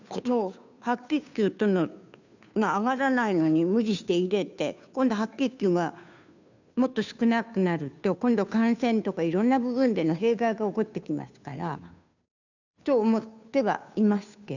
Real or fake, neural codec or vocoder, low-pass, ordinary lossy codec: fake; codec, 16 kHz, 2 kbps, FunCodec, trained on Chinese and English, 25 frames a second; 7.2 kHz; none